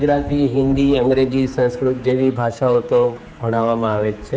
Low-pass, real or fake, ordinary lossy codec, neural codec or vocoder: none; fake; none; codec, 16 kHz, 4 kbps, X-Codec, HuBERT features, trained on general audio